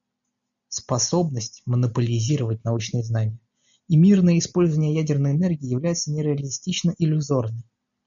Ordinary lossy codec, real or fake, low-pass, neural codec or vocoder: MP3, 96 kbps; real; 7.2 kHz; none